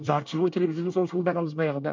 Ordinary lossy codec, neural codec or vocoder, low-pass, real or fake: MP3, 48 kbps; codec, 24 kHz, 1 kbps, SNAC; 7.2 kHz; fake